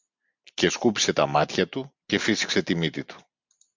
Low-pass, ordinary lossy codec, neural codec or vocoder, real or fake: 7.2 kHz; MP3, 64 kbps; none; real